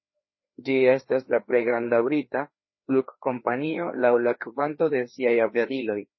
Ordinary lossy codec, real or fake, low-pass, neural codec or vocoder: MP3, 24 kbps; fake; 7.2 kHz; codec, 16 kHz, 2 kbps, FreqCodec, larger model